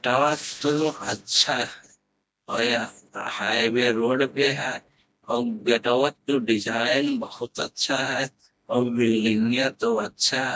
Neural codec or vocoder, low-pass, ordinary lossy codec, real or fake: codec, 16 kHz, 1 kbps, FreqCodec, smaller model; none; none; fake